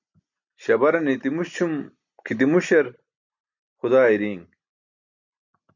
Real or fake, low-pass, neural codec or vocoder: real; 7.2 kHz; none